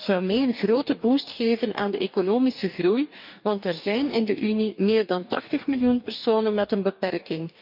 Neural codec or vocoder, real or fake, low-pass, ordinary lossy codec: codec, 44.1 kHz, 2.6 kbps, DAC; fake; 5.4 kHz; none